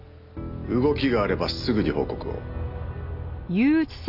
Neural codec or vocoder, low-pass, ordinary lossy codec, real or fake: none; 5.4 kHz; none; real